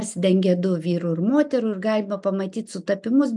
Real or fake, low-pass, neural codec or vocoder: real; 10.8 kHz; none